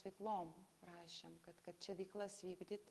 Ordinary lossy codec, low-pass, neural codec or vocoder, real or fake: Opus, 24 kbps; 14.4 kHz; autoencoder, 48 kHz, 128 numbers a frame, DAC-VAE, trained on Japanese speech; fake